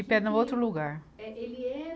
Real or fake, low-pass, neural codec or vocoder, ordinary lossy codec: real; none; none; none